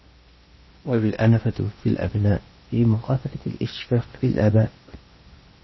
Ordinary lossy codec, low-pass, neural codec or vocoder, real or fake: MP3, 24 kbps; 7.2 kHz; codec, 16 kHz in and 24 kHz out, 0.8 kbps, FocalCodec, streaming, 65536 codes; fake